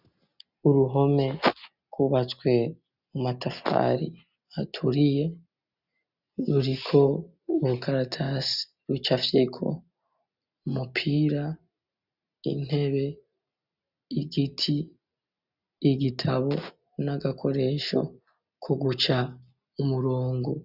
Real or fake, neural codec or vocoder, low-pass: real; none; 5.4 kHz